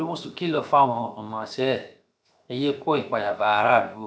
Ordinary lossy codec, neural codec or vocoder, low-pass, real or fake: none; codec, 16 kHz, 0.7 kbps, FocalCodec; none; fake